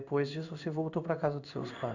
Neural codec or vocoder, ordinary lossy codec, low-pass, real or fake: codec, 16 kHz in and 24 kHz out, 1 kbps, XY-Tokenizer; none; 7.2 kHz; fake